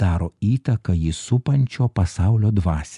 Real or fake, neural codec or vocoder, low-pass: real; none; 10.8 kHz